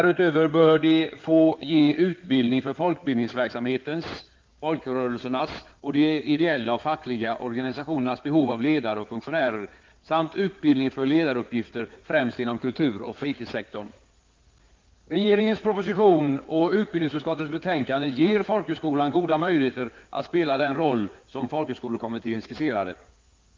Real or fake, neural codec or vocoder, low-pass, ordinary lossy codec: fake; codec, 16 kHz in and 24 kHz out, 2.2 kbps, FireRedTTS-2 codec; 7.2 kHz; Opus, 24 kbps